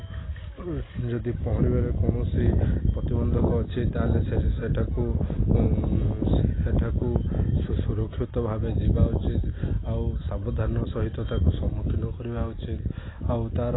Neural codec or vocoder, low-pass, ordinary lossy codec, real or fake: none; 7.2 kHz; AAC, 16 kbps; real